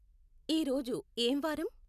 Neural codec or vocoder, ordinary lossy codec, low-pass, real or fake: none; none; 14.4 kHz; real